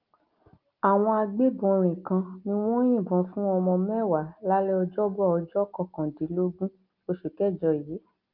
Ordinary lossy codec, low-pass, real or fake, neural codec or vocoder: Opus, 24 kbps; 5.4 kHz; real; none